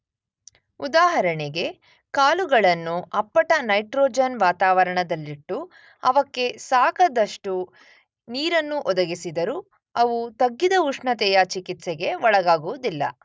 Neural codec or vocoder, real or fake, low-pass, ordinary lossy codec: none; real; none; none